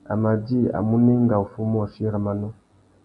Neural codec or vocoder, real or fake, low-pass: none; real; 10.8 kHz